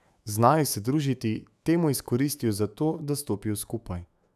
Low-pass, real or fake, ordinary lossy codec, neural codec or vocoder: 14.4 kHz; fake; none; autoencoder, 48 kHz, 128 numbers a frame, DAC-VAE, trained on Japanese speech